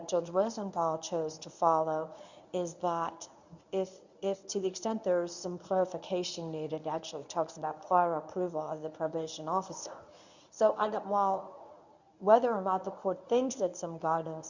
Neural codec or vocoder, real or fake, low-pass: codec, 24 kHz, 0.9 kbps, WavTokenizer, medium speech release version 1; fake; 7.2 kHz